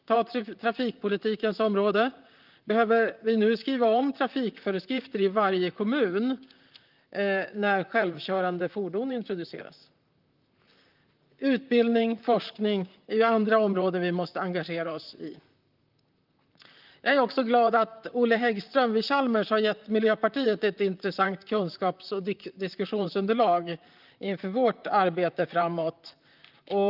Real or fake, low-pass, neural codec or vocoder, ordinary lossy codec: fake; 5.4 kHz; vocoder, 44.1 kHz, 128 mel bands, Pupu-Vocoder; Opus, 24 kbps